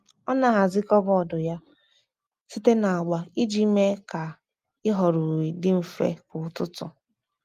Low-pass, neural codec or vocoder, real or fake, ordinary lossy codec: 14.4 kHz; none; real; Opus, 32 kbps